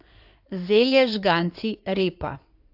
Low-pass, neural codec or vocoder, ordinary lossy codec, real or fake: 5.4 kHz; codec, 16 kHz in and 24 kHz out, 2.2 kbps, FireRedTTS-2 codec; AAC, 48 kbps; fake